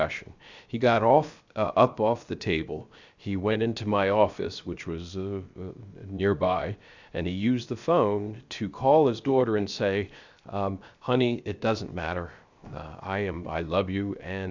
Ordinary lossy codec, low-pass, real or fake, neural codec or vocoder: Opus, 64 kbps; 7.2 kHz; fake; codec, 16 kHz, 0.7 kbps, FocalCodec